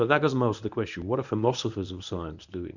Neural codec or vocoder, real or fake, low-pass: codec, 24 kHz, 0.9 kbps, WavTokenizer, medium speech release version 1; fake; 7.2 kHz